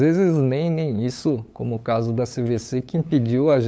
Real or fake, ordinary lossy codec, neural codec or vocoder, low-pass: fake; none; codec, 16 kHz, 8 kbps, FunCodec, trained on LibriTTS, 25 frames a second; none